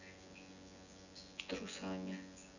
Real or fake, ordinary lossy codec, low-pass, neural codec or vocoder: fake; none; 7.2 kHz; vocoder, 24 kHz, 100 mel bands, Vocos